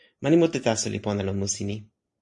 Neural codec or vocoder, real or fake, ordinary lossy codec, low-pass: none; real; MP3, 48 kbps; 10.8 kHz